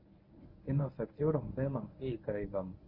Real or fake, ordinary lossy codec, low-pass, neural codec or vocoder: fake; Opus, 24 kbps; 5.4 kHz; codec, 24 kHz, 0.9 kbps, WavTokenizer, medium speech release version 1